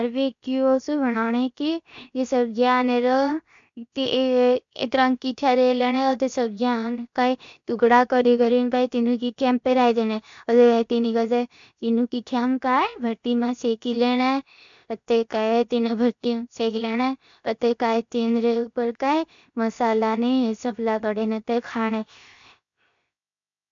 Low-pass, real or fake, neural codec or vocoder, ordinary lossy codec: 7.2 kHz; fake; codec, 16 kHz, 0.7 kbps, FocalCodec; MP3, 64 kbps